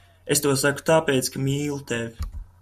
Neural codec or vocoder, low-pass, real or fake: none; 14.4 kHz; real